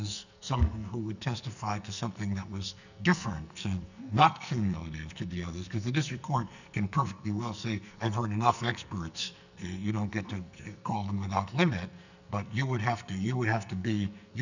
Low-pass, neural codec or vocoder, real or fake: 7.2 kHz; codec, 44.1 kHz, 2.6 kbps, SNAC; fake